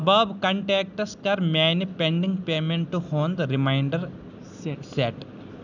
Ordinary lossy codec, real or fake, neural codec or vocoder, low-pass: none; real; none; 7.2 kHz